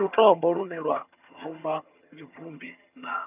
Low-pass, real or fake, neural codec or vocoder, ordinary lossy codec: 3.6 kHz; fake; vocoder, 22.05 kHz, 80 mel bands, HiFi-GAN; none